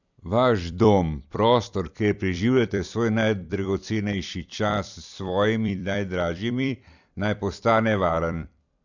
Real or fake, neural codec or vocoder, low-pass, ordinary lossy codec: fake; vocoder, 44.1 kHz, 128 mel bands every 256 samples, BigVGAN v2; 7.2 kHz; none